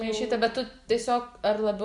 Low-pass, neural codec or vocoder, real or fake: 10.8 kHz; none; real